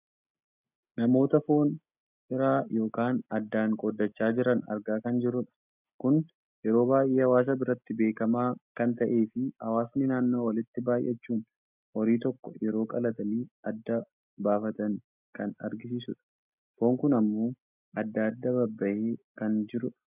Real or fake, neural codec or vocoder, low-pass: real; none; 3.6 kHz